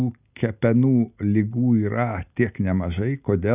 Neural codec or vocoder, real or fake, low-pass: none; real; 3.6 kHz